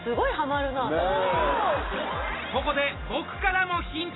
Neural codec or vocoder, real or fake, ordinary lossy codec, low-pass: none; real; AAC, 16 kbps; 7.2 kHz